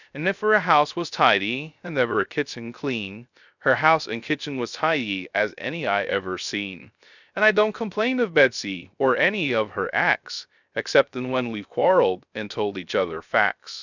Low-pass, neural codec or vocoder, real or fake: 7.2 kHz; codec, 16 kHz, 0.3 kbps, FocalCodec; fake